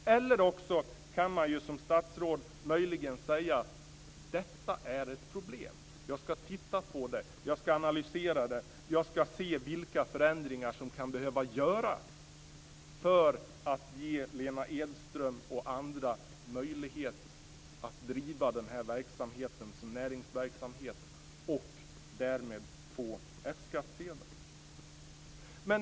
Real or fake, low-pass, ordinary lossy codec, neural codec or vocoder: real; none; none; none